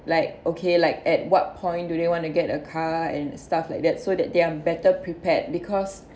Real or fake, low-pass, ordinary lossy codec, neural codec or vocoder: real; none; none; none